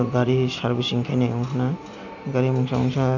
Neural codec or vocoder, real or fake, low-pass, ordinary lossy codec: none; real; 7.2 kHz; none